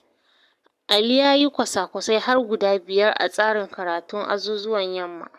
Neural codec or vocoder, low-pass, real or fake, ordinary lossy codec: codec, 44.1 kHz, 7.8 kbps, Pupu-Codec; 14.4 kHz; fake; none